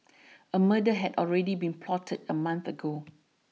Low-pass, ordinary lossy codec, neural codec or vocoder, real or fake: none; none; none; real